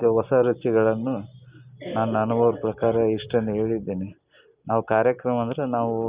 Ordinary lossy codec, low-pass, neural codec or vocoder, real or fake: Opus, 64 kbps; 3.6 kHz; none; real